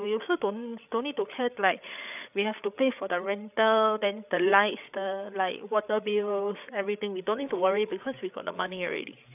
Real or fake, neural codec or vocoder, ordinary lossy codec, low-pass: fake; codec, 16 kHz, 8 kbps, FreqCodec, larger model; none; 3.6 kHz